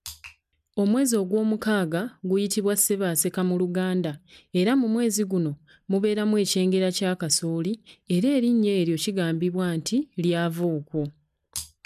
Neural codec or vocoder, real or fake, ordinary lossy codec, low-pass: none; real; none; 14.4 kHz